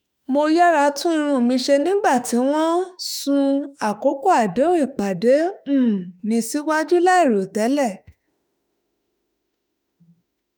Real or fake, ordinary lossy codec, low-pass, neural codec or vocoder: fake; none; none; autoencoder, 48 kHz, 32 numbers a frame, DAC-VAE, trained on Japanese speech